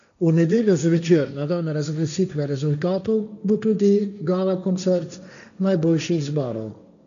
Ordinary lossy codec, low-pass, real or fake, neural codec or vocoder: none; 7.2 kHz; fake; codec, 16 kHz, 1.1 kbps, Voila-Tokenizer